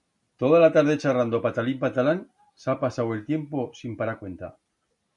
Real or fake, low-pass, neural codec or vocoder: real; 10.8 kHz; none